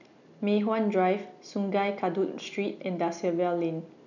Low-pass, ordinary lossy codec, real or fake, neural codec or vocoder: 7.2 kHz; none; real; none